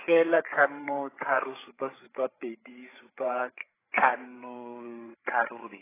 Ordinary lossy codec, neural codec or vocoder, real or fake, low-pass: AAC, 16 kbps; codec, 16 kHz, 8 kbps, FreqCodec, larger model; fake; 3.6 kHz